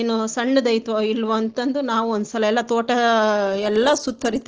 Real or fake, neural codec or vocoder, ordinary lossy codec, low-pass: real; none; Opus, 16 kbps; 7.2 kHz